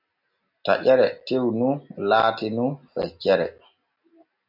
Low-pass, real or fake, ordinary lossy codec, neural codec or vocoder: 5.4 kHz; real; MP3, 48 kbps; none